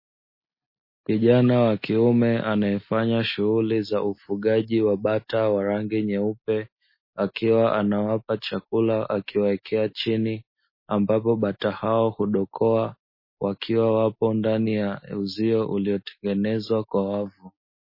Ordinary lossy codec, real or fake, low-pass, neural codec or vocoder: MP3, 24 kbps; real; 5.4 kHz; none